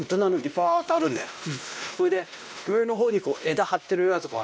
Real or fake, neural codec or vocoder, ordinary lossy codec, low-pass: fake; codec, 16 kHz, 1 kbps, X-Codec, WavLM features, trained on Multilingual LibriSpeech; none; none